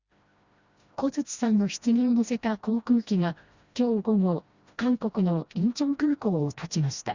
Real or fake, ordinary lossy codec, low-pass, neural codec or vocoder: fake; Opus, 64 kbps; 7.2 kHz; codec, 16 kHz, 1 kbps, FreqCodec, smaller model